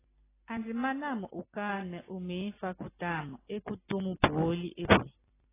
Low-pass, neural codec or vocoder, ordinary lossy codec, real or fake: 3.6 kHz; none; AAC, 16 kbps; real